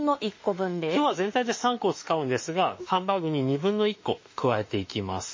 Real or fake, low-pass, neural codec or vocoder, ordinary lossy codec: fake; 7.2 kHz; autoencoder, 48 kHz, 32 numbers a frame, DAC-VAE, trained on Japanese speech; MP3, 32 kbps